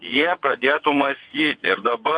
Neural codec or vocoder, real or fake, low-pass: vocoder, 22.05 kHz, 80 mel bands, WaveNeXt; fake; 9.9 kHz